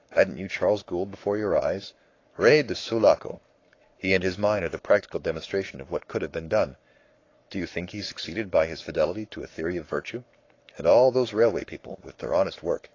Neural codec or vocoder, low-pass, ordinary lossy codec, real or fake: codec, 44.1 kHz, 7.8 kbps, Pupu-Codec; 7.2 kHz; AAC, 32 kbps; fake